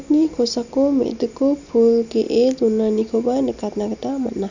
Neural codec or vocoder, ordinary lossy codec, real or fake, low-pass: none; none; real; 7.2 kHz